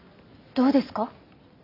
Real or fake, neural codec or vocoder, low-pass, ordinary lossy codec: real; none; 5.4 kHz; none